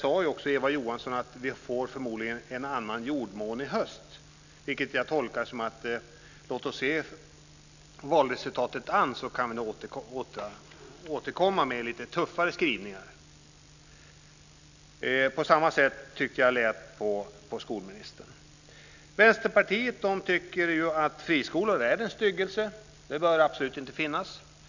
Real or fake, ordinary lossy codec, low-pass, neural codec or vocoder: real; none; 7.2 kHz; none